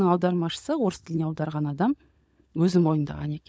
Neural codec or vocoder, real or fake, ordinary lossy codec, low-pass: codec, 16 kHz, 16 kbps, FunCodec, trained on LibriTTS, 50 frames a second; fake; none; none